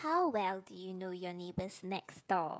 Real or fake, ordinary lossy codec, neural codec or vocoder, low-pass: fake; none; codec, 16 kHz, 16 kbps, FreqCodec, smaller model; none